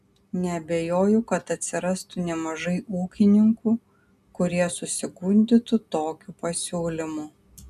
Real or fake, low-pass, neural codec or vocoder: real; 14.4 kHz; none